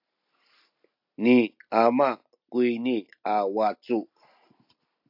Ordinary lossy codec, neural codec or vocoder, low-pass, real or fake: AAC, 48 kbps; none; 5.4 kHz; real